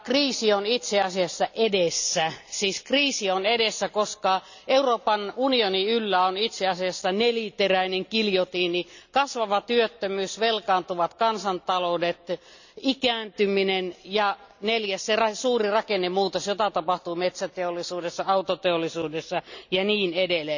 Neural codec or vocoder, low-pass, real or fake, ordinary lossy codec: none; 7.2 kHz; real; none